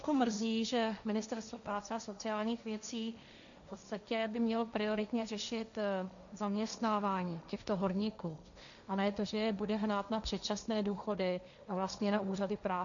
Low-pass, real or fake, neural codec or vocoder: 7.2 kHz; fake; codec, 16 kHz, 1.1 kbps, Voila-Tokenizer